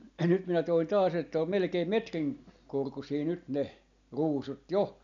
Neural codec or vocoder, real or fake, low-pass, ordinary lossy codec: none; real; 7.2 kHz; none